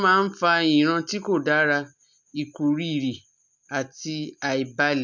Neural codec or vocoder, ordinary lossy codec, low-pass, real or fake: none; none; 7.2 kHz; real